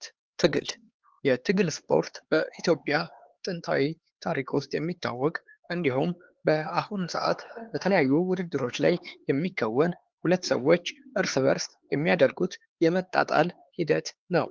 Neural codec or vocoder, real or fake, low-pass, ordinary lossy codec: codec, 16 kHz, 4 kbps, X-Codec, HuBERT features, trained on LibriSpeech; fake; 7.2 kHz; Opus, 16 kbps